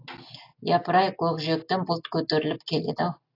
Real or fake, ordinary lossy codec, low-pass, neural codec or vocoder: real; AAC, 32 kbps; 5.4 kHz; none